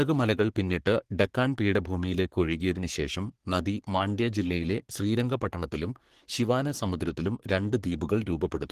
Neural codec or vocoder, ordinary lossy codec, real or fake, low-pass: codec, 44.1 kHz, 3.4 kbps, Pupu-Codec; Opus, 16 kbps; fake; 14.4 kHz